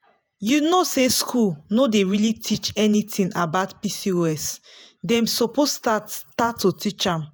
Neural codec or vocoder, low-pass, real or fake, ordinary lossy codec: vocoder, 48 kHz, 128 mel bands, Vocos; none; fake; none